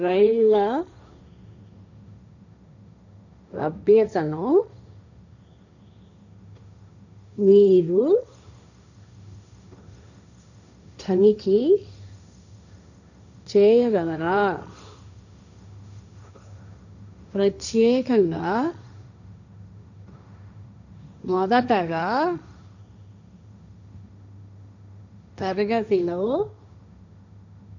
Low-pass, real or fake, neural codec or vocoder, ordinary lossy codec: 7.2 kHz; fake; codec, 16 kHz, 1.1 kbps, Voila-Tokenizer; none